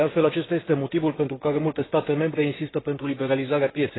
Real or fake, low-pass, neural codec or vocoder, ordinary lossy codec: fake; 7.2 kHz; codec, 16 kHz, 6 kbps, DAC; AAC, 16 kbps